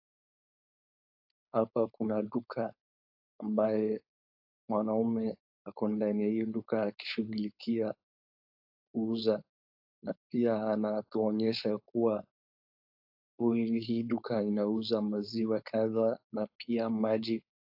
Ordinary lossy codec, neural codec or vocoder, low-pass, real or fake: AAC, 48 kbps; codec, 16 kHz, 4.8 kbps, FACodec; 5.4 kHz; fake